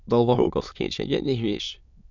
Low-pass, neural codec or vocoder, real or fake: 7.2 kHz; autoencoder, 22.05 kHz, a latent of 192 numbers a frame, VITS, trained on many speakers; fake